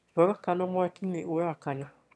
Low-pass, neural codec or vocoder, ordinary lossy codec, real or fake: none; autoencoder, 22.05 kHz, a latent of 192 numbers a frame, VITS, trained on one speaker; none; fake